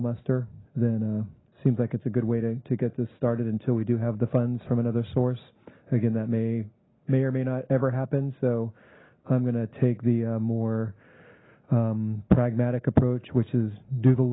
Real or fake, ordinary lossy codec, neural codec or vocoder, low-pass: real; AAC, 16 kbps; none; 7.2 kHz